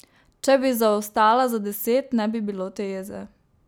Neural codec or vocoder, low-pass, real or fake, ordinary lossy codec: none; none; real; none